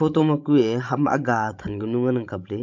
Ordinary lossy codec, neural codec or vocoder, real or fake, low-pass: MP3, 64 kbps; codec, 16 kHz, 16 kbps, FreqCodec, larger model; fake; 7.2 kHz